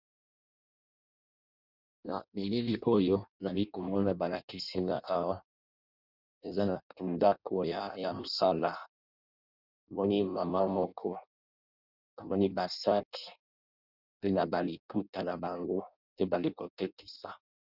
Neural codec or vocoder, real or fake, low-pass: codec, 16 kHz in and 24 kHz out, 0.6 kbps, FireRedTTS-2 codec; fake; 5.4 kHz